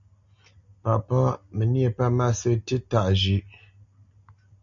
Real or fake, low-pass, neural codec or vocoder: real; 7.2 kHz; none